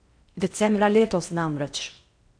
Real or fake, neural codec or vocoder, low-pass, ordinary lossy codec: fake; codec, 16 kHz in and 24 kHz out, 0.6 kbps, FocalCodec, streaming, 4096 codes; 9.9 kHz; MP3, 96 kbps